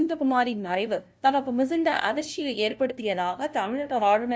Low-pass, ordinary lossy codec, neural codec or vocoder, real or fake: none; none; codec, 16 kHz, 0.5 kbps, FunCodec, trained on LibriTTS, 25 frames a second; fake